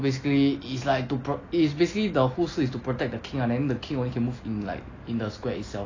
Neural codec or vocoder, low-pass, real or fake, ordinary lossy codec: none; 7.2 kHz; real; AAC, 32 kbps